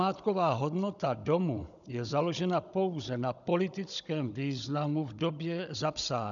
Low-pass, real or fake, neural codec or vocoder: 7.2 kHz; fake; codec, 16 kHz, 16 kbps, FunCodec, trained on Chinese and English, 50 frames a second